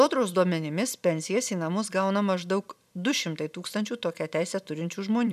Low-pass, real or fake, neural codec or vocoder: 14.4 kHz; fake; vocoder, 44.1 kHz, 128 mel bands every 256 samples, BigVGAN v2